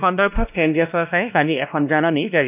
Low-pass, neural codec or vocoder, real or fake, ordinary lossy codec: 3.6 kHz; codec, 16 kHz, 1 kbps, X-Codec, WavLM features, trained on Multilingual LibriSpeech; fake; none